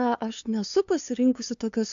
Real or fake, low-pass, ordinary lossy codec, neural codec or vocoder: fake; 7.2 kHz; AAC, 64 kbps; codec, 16 kHz, 2 kbps, FunCodec, trained on LibriTTS, 25 frames a second